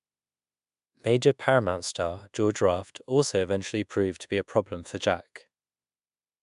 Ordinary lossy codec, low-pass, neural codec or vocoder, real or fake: MP3, 96 kbps; 10.8 kHz; codec, 24 kHz, 1.2 kbps, DualCodec; fake